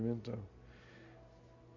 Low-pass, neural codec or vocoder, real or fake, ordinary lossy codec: 7.2 kHz; none; real; MP3, 64 kbps